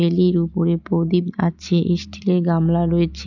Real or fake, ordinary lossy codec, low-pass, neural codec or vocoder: real; none; 7.2 kHz; none